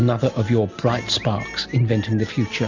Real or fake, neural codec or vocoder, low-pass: real; none; 7.2 kHz